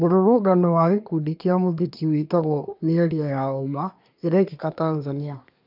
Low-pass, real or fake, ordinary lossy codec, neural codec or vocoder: 5.4 kHz; fake; none; codec, 24 kHz, 1 kbps, SNAC